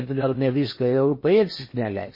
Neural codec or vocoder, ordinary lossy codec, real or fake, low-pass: codec, 16 kHz in and 24 kHz out, 0.8 kbps, FocalCodec, streaming, 65536 codes; MP3, 24 kbps; fake; 5.4 kHz